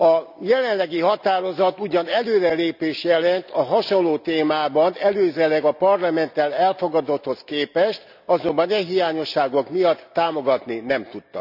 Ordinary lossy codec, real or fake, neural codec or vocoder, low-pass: none; real; none; 5.4 kHz